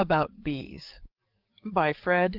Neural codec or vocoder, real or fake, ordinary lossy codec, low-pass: codec, 44.1 kHz, 7.8 kbps, DAC; fake; Opus, 32 kbps; 5.4 kHz